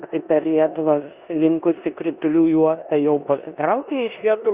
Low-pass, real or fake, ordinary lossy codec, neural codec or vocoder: 3.6 kHz; fake; Opus, 64 kbps; codec, 16 kHz in and 24 kHz out, 0.9 kbps, LongCat-Audio-Codec, four codebook decoder